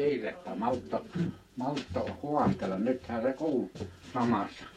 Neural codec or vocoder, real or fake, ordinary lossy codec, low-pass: vocoder, 48 kHz, 128 mel bands, Vocos; fake; MP3, 64 kbps; 19.8 kHz